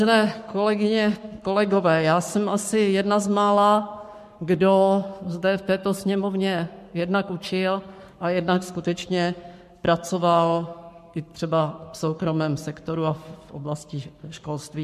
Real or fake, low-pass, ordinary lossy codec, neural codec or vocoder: fake; 14.4 kHz; MP3, 64 kbps; codec, 44.1 kHz, 7.8 kbps, Pupu-Codec